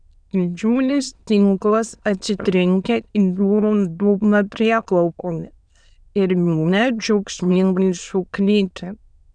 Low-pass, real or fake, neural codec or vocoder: 9.9 kHz; fake; autoencoder, 22.05 kHz, a latent of 192 numbers a frame, VITS, trained on many speakers